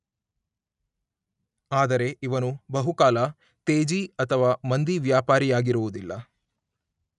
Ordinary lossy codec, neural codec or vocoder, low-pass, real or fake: none; none; 10.8 kHz; real